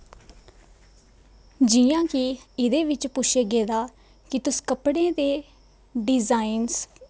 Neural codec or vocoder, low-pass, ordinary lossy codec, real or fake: none; none; none; real